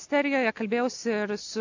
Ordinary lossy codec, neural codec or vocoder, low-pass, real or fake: AAC, 48 kbps; none; 7.2 kHz; real